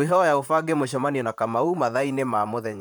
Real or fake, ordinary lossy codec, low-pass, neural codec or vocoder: fake; none; none; vocoder, 44.1 kHz, 128 mel bands, Pupu-Vocoder